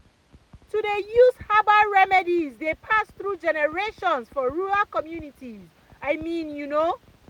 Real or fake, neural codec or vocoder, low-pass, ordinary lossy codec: real; none; 19.8 kHz; none